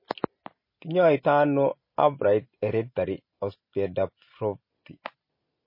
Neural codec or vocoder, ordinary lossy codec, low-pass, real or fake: none; MP3, 24 kbps; 5.4 kHz; real